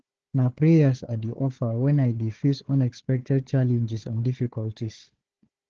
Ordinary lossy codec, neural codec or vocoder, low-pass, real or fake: Opus, 16 kbps; codec, 16 kHz, 4 kbps, FunCodec, trained on Chinese and English, 50 frames a second; 7.2 kHz; fake